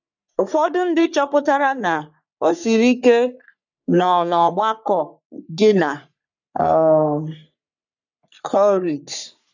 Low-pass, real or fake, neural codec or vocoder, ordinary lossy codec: 7.2 kHz; fake; codec, 44.1 kHz, 3.4 kbps, Pupu-Codec; none